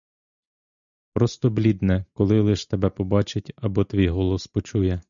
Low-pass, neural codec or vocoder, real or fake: 7.2 kHz; none; real